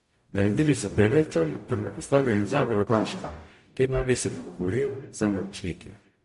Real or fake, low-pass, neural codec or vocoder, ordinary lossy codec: fake; 14.4 kHz; codec, 44.1 kHz, 0.9 kbps, DAC; MP3, 48 kbps